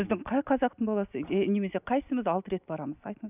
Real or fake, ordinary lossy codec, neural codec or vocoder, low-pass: real; none; none; 3.6 kHz